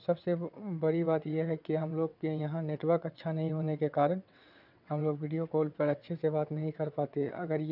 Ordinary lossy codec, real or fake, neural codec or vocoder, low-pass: none; fake; vocoder, 22.05 kHz, 80 mel bands, WaveNeXt; 5.4 kHz